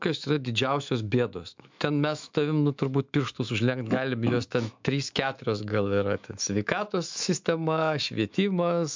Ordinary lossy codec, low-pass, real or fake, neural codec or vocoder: MP3, 64 kbps; 7.2 kHz; fake; autoencoder, 48 kHz, 128 numbers a frame, DAC-VAE, trained on Japanese speech